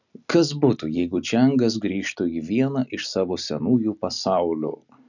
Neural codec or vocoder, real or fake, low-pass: none; real; 7.2 kHz